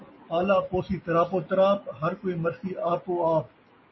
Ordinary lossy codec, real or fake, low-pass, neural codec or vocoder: MP3, 24 kbps; real; 7.2 kHz; none